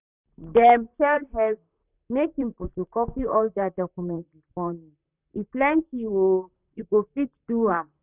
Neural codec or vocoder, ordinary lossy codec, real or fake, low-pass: vocoder, 44.1 kHz, 128 mel bands, Pupu-Vocoder; none; fake; 3.6 kHz